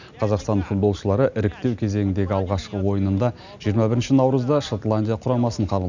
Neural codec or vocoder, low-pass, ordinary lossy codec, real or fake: none; 7.2 kHz; none; real